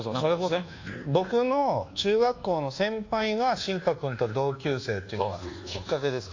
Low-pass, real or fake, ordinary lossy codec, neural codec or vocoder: 7.2 kHz; fake; AAC, 48 kbps; codec, 24 kHz, 1.2 kbps, DualCodec